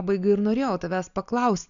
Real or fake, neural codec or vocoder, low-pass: real; none; 7.2 kHz